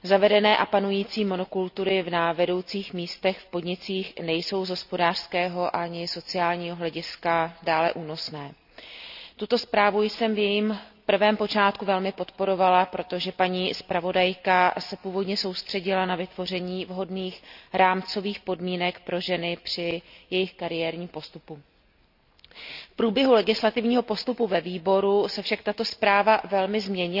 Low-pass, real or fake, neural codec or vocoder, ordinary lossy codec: 5.4 kHz; real; none; none